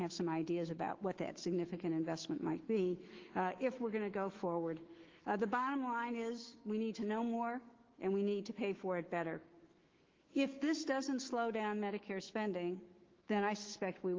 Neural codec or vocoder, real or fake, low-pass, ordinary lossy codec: autoencoder, 48 kHz, 128 numbers a frame, DAC-VAE, trained on Japanese speech; fake; 7.2 kHz; Opus, 16 kbps